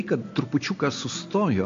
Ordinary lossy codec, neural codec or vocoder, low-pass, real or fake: AAC, 48 kbps; none; 7.2 kHz; real